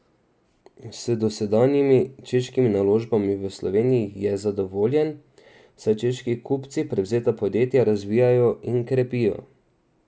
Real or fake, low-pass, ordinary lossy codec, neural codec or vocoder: real; none; none; none